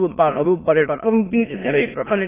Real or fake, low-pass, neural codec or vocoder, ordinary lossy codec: fake; 3.6 kHz; autoencoder, 22.05 kHz, a latent of 192 numbers a frame, VITS, trained on many speakers; AAC, 16 kbps